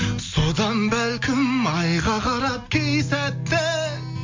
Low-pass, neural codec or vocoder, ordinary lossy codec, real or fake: 7.2 kHz; none; MP3, 48 kbps; real